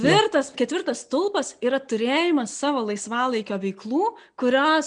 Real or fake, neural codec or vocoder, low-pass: real; none; 9.9 kHz